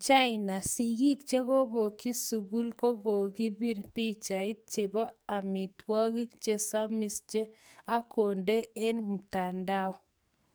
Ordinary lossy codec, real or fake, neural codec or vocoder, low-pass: none; fake; codec, 44.1 kHz, 2.6 kbps, SNAC; none